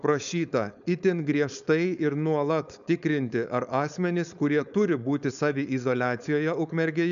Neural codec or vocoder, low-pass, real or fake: codec, 16 kHz, 4.8 kbps, FACodec; 7.2 kHz; fake